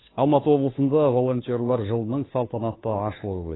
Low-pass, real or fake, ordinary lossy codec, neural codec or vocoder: 7.2 kHz; fake; AAC, 16 kbps; codec, 16 kHz, 2 kbps, FunCodec, trained on Chinese and English, 25 frames a second